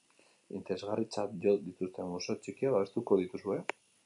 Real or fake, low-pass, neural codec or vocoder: real; 10.8 kHz; none